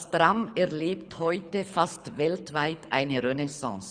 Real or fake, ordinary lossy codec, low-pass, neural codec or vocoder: fake; Opus, 64 kbps; 9.9 kHz; codec, 24 kHz, 6 kbps, HILCodec